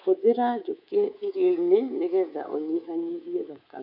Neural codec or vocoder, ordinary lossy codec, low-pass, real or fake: codec, 24 kHz, 3.1 kbps, DualCodec; none; 5.4 kHz; fake